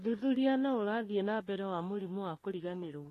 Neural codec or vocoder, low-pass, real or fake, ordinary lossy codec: codec, 44.1 kHz, 3.4 kbps, Pupu-Codec; 14.4 kHz; fake; AAC, 48 kbps